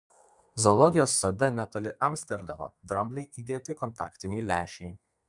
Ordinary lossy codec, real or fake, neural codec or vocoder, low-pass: MP3, 96 kbps; fake; codec, 32 kHz, 1.9 kbps, SNAC; 10.8 kHz